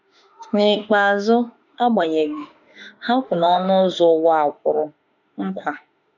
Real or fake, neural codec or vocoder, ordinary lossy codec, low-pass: fake; autoencoder, 48 kHz, 32 numbers a frame, DAC-VAE, trained on Japanese speech; none; 7.2 kHz